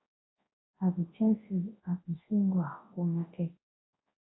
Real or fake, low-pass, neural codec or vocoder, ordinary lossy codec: fake; 7.2 kHz; codec, 24 kHz, 0.9 kbps, WavTokenizer, large speech release; AAC, 16 kbps